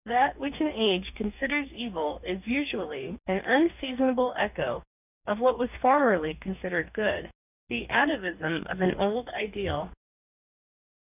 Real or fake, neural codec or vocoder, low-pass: fake; codec, 44.1 kHz, 2.6 kbps, DAC; 3.6 kHz